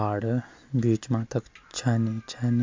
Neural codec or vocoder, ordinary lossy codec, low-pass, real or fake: none; MP3, 64 kbps; 7.2 kHz; real